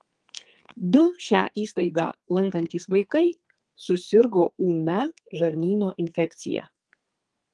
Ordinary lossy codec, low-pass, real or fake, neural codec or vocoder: Opus, 24 kbps; 10.8 kHz; fake; codec, 32 kHz, 1.9 kbps, SNAC